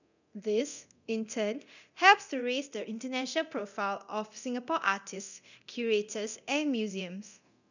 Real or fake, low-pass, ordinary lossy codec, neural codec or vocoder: fake; 7.2 kHz; none; codec, 24 kHz, 0.9 kbps, DualCodec